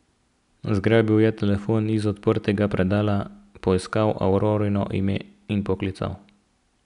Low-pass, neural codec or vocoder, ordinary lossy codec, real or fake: 10.8 kHz; none; none; real